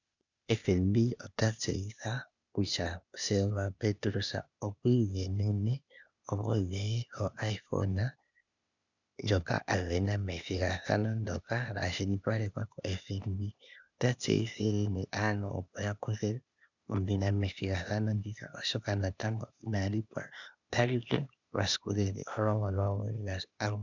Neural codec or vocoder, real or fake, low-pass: codec, 16 kHz, 0.8 kbps, ZipCodec; fake; 7.2 kHz